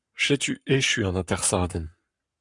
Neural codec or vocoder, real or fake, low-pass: codec, 44.1 kHz, 7.8 kbps, Pupu-Codec; fake; 10.8 kHz